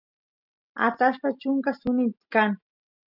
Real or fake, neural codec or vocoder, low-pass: real; none; 5.4 kHz